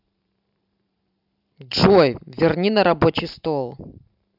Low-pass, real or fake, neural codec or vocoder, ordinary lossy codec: 5.4 kHz; fake; vocoder, 44.1 kHz, 128 mel bands every 512 samples, BigVGAN v2; none